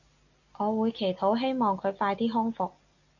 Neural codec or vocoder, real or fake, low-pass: none; real; 7.2 kHz